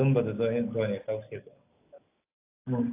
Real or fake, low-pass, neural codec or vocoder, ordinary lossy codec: real; 3.6 kHz; none; AAC, 24 kbps